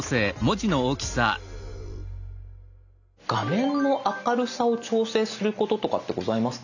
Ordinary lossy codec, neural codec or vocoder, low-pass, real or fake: none; none; 7.2 kHz; real